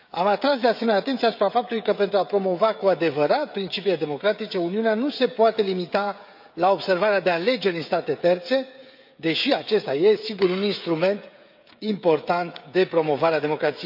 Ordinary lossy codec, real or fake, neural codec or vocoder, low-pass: AAC, 48 kbps; fake; codec, 16 kHz, 16 kbps, FreqCodec, smaller model; 5.4 kHz